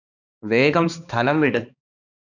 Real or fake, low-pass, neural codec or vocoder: fake; 7.2 kHz; codec, 16 kHz, 2 kbps, X-Codec, HuBERT features, trained on balanced general audio